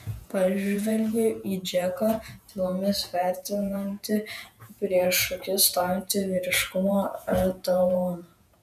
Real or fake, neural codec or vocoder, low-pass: fake; vocoder, 48 kHz, 128 mel bands, Vocos; 14.4 kHz